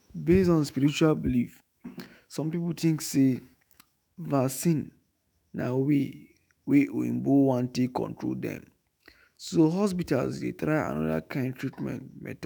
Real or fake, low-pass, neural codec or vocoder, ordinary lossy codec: fake; none; autoencoder, 48 kHz, 128 numbers a frame, DAC-VAE, trained on Japanese speech; none